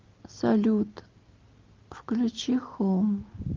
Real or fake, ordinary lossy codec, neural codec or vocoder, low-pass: real; Opus, 24 kbps; none; 7.2 kHz